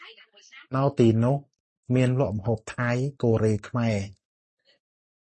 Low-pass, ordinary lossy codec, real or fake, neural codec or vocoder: 10.8 kHz; MP3, 32 kbps; real; none